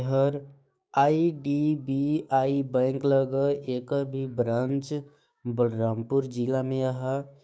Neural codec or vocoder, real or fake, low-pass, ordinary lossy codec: codec, 16 kHz, 6 kbps, DAC; fake; none; none